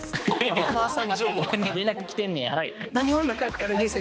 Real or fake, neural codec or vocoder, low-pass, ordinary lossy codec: fake; codec, 16 kHz, 2 kbps, X-Codec, HuBERT features, trained on balanced general audio; none; none